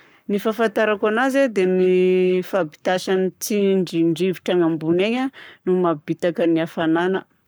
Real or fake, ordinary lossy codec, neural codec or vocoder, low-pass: fake; none; codec, 44.1 kHz, 7.8 kbps, Pupu-Codec; none